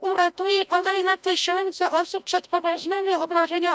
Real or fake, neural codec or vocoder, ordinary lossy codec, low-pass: fake; codec, 16 kHz, 0.5 kbps, FreqCodec, larger model; none; none